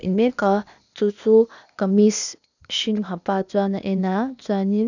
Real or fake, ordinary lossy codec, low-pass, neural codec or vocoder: fake; none; 7.2 kHz; codec, 16 kHz, 0.8 kbps, ZipCodec